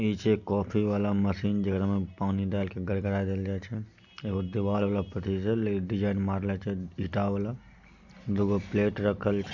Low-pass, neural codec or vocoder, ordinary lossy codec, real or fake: 7.2 kHz; none; none; real